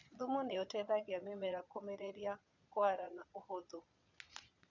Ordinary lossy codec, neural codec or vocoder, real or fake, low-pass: none; vocoder, 22.05 kHz, 80 mel bands, Vocos; fake; 7.2 kHz